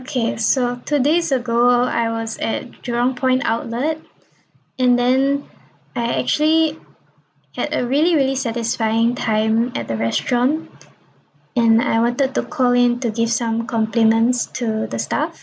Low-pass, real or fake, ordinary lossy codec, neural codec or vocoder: none; real; none; none